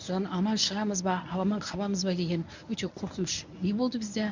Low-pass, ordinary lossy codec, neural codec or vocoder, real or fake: 7.2 kHz; none; codec, 24 kHz, 0.9 kbps, WavTokenizer, medium speech release version 1; fake